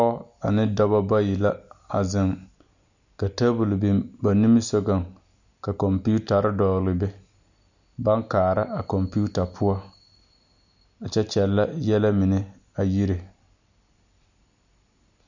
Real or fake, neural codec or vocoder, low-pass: real; none; 7.2 kHz